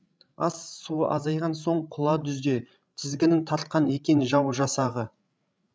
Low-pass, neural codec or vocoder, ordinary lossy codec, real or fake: none; codec, 16 kHz, 16 kbps, FreqCodec, larger model; none; fake